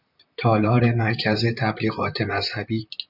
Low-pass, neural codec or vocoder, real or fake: 5.4 kHz; vocoder, 44.1 kHz, 128 mel bands, Pupu-Vocoder; fake